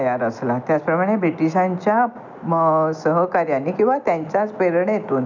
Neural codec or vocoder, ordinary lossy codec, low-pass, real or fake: none; none; 7.2 kHz; real